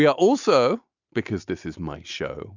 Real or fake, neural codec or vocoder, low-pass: real; none; 7.2 kHz